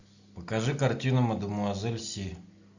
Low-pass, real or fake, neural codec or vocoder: 7.2 kHz; real; none